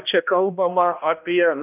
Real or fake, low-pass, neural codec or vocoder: fake; 3.6 kHz; codec, 16 kHz, 1 kbps, X-Codec, HuBERT features, trained on balanced general audio